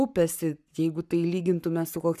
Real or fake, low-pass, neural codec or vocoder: fake; 14.4 kHz; codec, 44.1 kHz, 7.8 kbps, Pupu-Codec